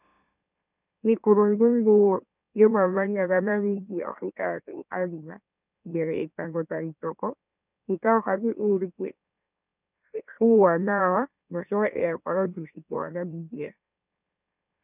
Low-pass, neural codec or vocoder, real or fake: 3.6 kHz; autoencoder, 44.1 kHz, a latent of 192 numbers a frame, MeloTTS; fake